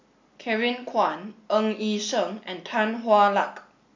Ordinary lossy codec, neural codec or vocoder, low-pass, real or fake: MP3, 64 kbps; none; 7.2 kHz; real